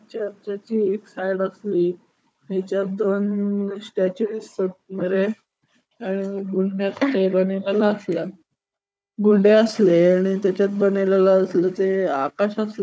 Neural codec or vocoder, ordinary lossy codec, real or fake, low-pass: codec, 16 kHz, 4 kbps, FunCodec, trained on Chinese and English, 50 frames a second; none; fake; none